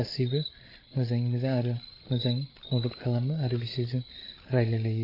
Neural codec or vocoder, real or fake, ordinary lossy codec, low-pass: codec, 16 kHz, 8 kbps, FreqCodec, larger model; fake; AAC, 24 kbps; 5.4 kHz